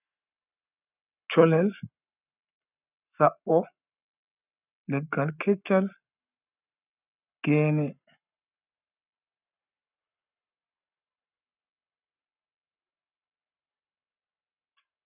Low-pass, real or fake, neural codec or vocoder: 3.6 kHz; fake; codec, 44.1 kHz, 7.8 kbps, Pupu-Codec